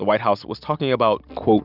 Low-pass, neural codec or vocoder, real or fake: 5.4 kHz; none; real